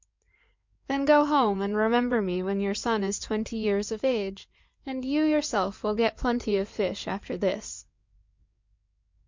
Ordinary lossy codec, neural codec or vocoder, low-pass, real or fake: MP3, 64 kbps; codec, 16 kHz in and 24 kHz out, 2.2 kbps, FireRedTTS-2 codec; 7.2 kHz; fake